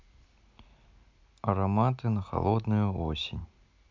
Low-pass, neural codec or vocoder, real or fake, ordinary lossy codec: 7.2 kHz; none; real; MP3, 64 kbps